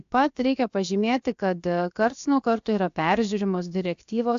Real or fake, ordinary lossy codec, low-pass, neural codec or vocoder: fake; AAC, 64 kbps; 7.2 kHz; codec, 16 kHz, about 1 kbps, DyCAST, with the encoder's durations